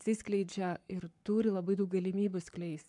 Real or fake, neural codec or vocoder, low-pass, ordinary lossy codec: fake; codec, 44.1 kHz, 7.8 kbps, Pupu-Codec; 10.8 kHz; MP3, 96 kbps